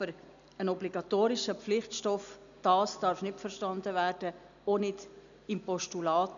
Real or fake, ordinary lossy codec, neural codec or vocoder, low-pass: real; MP3, 96 kbps; none; 7.2 kHz